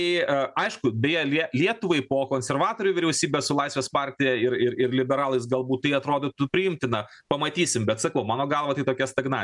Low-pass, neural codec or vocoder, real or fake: 10.8 kHz; none; real